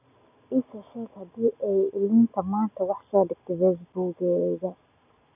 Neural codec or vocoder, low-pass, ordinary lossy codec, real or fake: none; 3.6 kHz; none; real